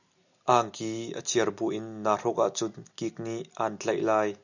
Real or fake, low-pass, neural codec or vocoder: real; 7.2 kHz; none